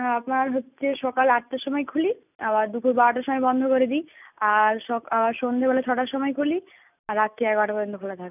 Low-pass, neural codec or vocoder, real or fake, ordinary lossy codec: 3.6 kHz; none; real; none